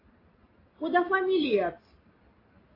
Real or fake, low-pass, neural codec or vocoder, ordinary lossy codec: real; 5.4 kHz; none; AAC, 24 kbps